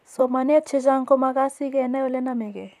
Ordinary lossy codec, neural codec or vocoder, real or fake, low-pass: none; vocoder, 44.1 kHz, 128 mel bands, Pupu-Vocoder; fake; 14.4 kHz